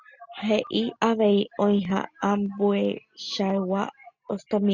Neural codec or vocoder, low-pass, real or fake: none; 7.2 kHz; real